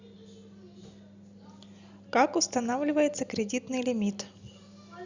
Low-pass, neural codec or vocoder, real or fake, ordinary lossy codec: 7.2 kHz; none; real; Opus, 64 kbps